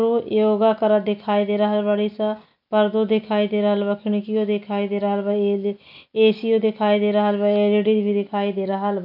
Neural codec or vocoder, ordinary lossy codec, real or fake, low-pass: none; none; real; 5.4 kHz